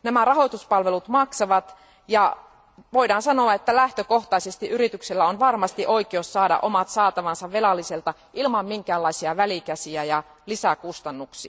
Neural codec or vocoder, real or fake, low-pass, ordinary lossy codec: none; real; none; none